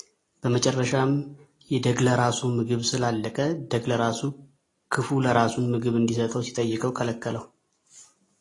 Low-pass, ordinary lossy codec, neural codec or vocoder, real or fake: 10.8 kHz; AAC, 32 kbps; none; real